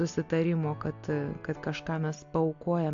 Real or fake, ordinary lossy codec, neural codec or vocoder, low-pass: real; AAC, 48 kbps; none; 7.2 kHz